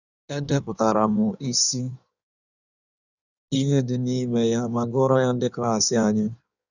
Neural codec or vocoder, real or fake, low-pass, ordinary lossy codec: codec, 16 kHz in and 24 kHz out, 1.1 kbps, FireRedTTS-2 codec; fake; 7.2 kHz; none